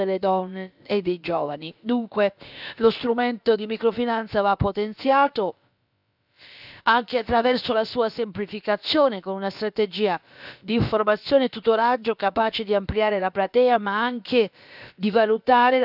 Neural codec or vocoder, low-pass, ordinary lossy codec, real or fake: codec, 16 kHz, about 1 kbps, DyCAST, with the encoder's durations; 5.4 kHz; none; fake